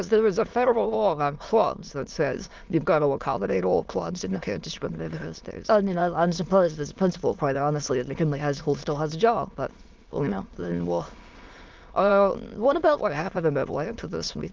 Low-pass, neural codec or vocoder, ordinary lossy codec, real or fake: 7.2 kHz; autoencoder, 22.05 kHz, a latent of 192 numbers a frame, VITS, trained on many speakers; Opus, 24 kbps; fake